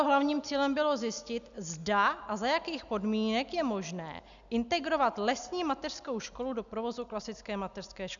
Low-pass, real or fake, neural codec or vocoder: 7.2 kHz; real; none